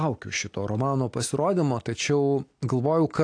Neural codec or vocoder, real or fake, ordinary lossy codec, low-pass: none; real; AAC, 48 kbps; 9.9 kHz